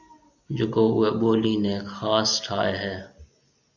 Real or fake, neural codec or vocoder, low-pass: real; none; 7.2 kHz